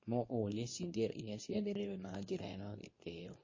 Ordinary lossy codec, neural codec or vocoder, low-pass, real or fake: MP3, 32 kbps; codec, 24 kHz, 0.9 kbps, WavTokenizer, medium speech release version 2; 7.2 kHz; fake